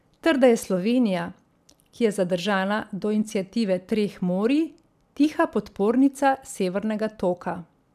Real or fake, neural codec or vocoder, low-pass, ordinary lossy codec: fake; vocoder, 44.1 kHz, 128 mel bands every 256 samples, BigVGAN v2; 14.4 kHz; none